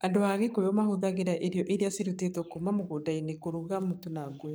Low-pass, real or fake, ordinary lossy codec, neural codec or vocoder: none; fake; none; codec, 44.1 kHz, 7.8 kbps, Pupu-Codec